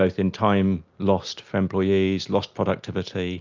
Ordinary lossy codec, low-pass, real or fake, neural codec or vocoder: Opus, 24 kbps; 7.2 kHz; real; none